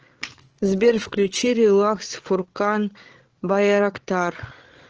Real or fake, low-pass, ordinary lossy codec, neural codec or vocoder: fake; 7.2 kHz; Opus, 16 kbps; codec, 16 kHz, 8 kbps, FreqCodec, larger model